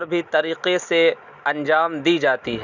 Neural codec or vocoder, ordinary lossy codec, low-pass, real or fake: none; none; 7.2 kHz; real